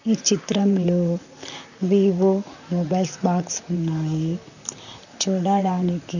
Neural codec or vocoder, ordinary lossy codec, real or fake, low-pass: vocoder, 44.1 kHz, 128 mel bands, Pupu-Vocoder; none; fake; 7.2 kHz